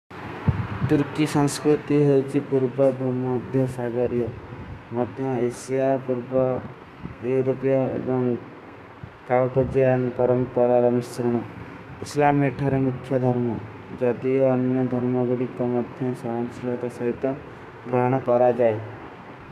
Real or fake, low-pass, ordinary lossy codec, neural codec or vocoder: fake; 14.4 kHz; none; codec, 32 kHz, 1.9 kbps, SNAC